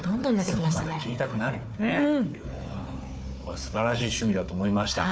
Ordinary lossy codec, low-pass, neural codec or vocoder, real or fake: none; none; codec, 16 kHz, 4 kbps, FunCodec, trained on Chinese and English, 50 frames a second; fake